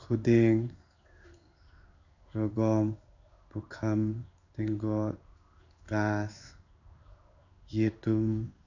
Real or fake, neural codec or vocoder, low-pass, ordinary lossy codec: fake; codec, 16 kHz in and 24 kHz out, 1 kbps, XY-Tokenizer; 7.2 kHz; none